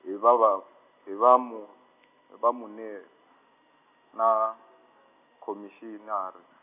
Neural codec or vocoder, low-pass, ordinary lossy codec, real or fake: none; 3.6 kHz; none; real